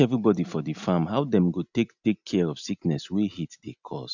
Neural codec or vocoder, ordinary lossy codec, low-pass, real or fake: none; none; 7.2 kHz; real